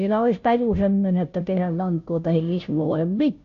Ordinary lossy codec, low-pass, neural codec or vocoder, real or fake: none; 7.2 kHz; codec, 16 kHz, 0.5 kbps, FunCodec, trained on Chinese and English, 25 frames a second; fake